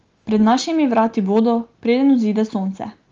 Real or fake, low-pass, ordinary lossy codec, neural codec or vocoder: real; 7.2 kHz; Opus, 24 kbps; none